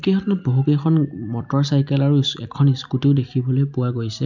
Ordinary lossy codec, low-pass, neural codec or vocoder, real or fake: none; 7.2 kHz; none; real